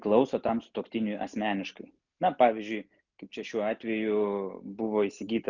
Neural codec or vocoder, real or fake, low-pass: none; real; 7.2 kHz